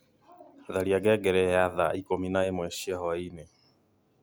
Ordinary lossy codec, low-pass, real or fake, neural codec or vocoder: none; none; real; none